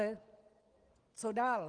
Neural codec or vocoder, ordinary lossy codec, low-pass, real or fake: none; Opus, 24 kbps; 9.9 kHz; real